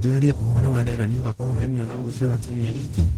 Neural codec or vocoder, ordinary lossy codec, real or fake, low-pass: codec, 44.1 kHz, 0.9 kbps, DAC; Opus, 16 kbps; fake; 19.8 kHz